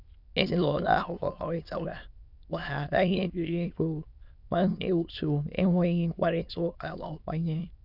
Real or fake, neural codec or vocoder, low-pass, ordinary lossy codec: fake; autoencoder, 22.05 kHz, a latent of 192 numbers a frame, VITS, trained on many speakers; 5.4 kHz; none